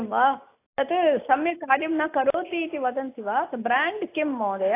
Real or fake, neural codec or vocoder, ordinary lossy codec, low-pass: real; none; AAC, 24 kbps; 3.6 kHz